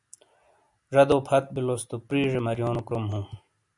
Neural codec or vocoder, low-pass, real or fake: none; 10.8 kHz; real